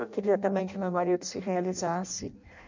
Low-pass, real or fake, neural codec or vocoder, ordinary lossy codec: 7.2 kHz; fake; codec, 16 kHz in and 24 kHz out, 0.6 kbps, FireRedTTS-2 codec; MP3, 64 kbps